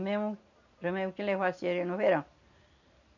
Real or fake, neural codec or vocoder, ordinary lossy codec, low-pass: real; none; none; 7.2 kHz